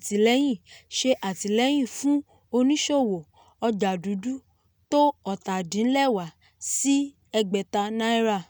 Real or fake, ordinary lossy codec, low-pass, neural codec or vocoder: real; none; none; none